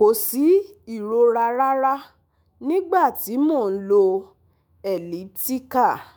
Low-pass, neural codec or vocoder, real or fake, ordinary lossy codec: none; autoencoder, 48 kHz, 128 numbers a frame, DAC-VAE, trained on Japanese speech; fake; none